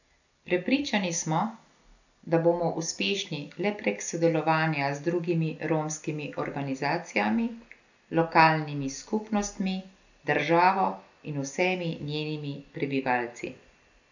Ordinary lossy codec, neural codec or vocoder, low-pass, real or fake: none; none; 7.2 kHz; real